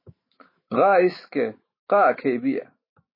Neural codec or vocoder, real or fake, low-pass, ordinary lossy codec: autoencoder, 48 kHz, 128 numbers a frame, DAC-VAE, trained on Japanese speech; fake; 5.4 kHz; MP3, 24 kbps